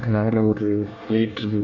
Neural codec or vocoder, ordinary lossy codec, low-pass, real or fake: codec, 24 kHz, 1 kbps, SNAC; MP3, 48 kbps; 7.2 kHz; fake